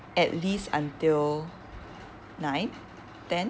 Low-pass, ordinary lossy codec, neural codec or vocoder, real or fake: none; none; none; real